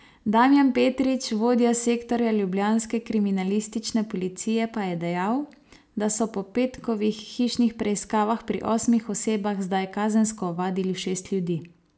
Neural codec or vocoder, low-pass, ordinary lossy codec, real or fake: none; none; none; real